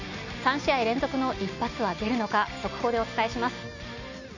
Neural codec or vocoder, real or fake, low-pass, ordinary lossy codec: none; real; 7.2 kHz; none